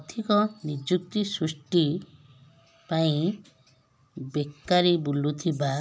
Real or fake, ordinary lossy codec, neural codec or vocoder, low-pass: real; none; none; none